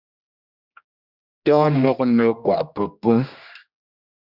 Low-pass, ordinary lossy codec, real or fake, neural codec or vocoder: 5.4 kHz; Opus, 64 kbps; fake; codec, 16 kHz, 1 kbps, X-Codec, HuBERT features, trained on general audio